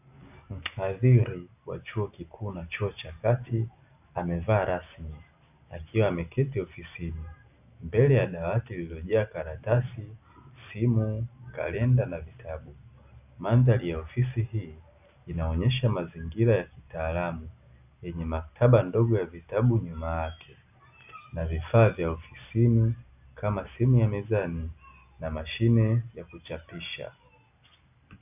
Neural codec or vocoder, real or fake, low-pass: none; real; 3.6 kHz